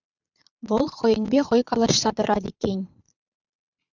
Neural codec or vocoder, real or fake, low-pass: vocoder, 22.05 kHz, 80 mel bands, Vocos; fake; 7.2 kHz